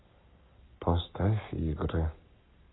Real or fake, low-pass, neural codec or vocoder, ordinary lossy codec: fake; 7.2 kHz; codec, 44.1 kHz, 7.8 kbps, Pupu-Codec; AAC, 16 kbps